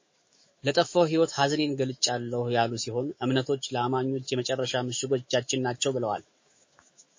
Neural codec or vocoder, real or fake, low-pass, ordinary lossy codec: autoencoder, 48 kHz, 128 numbers a frame, DAC-VAE, trained on Japanese speech; fake; 7.2 kHz; MP3, 32 kbps